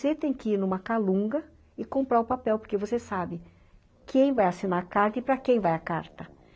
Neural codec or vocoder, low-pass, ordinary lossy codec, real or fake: none; none; none; real